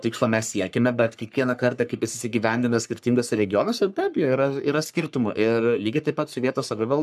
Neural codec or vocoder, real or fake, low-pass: codec, 44.1 kHz, 3.4 kbps, Pupu-Codec; fake; 14.4 kHz